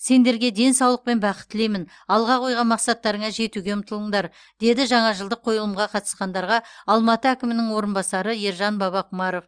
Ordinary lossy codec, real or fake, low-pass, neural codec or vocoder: Opus, 32 kbps; real; 9.9 kHz; none